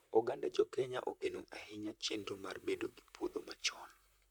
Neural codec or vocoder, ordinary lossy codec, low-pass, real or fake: vocoder, 44.1 kHz, 128 mel bands, Pupu-Vocoder; none; none; fake